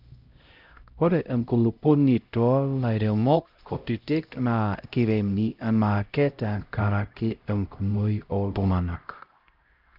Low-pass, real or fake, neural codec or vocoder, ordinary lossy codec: 5.4 kHz; fake; codec, 16 kHz, 0.5 kbps, X-Codec, HuBERT features, trained on LibriSpeech; Opus, 24 kbps